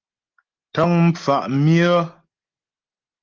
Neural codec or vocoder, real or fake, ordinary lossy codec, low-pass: none; real; Opus, 16 kbps; 7.2 kHz